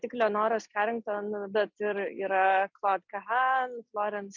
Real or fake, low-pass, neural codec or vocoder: real; 7.2 kHz; none